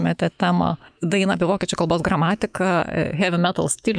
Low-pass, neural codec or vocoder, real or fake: 9.9 kHz; autoencoder, 48 kHz, 128 numbers a frame, DAC-VAE, trained on Japanese speech; fake